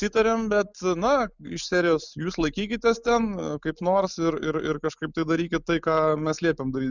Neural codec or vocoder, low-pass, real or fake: none; 7.2 kHz; real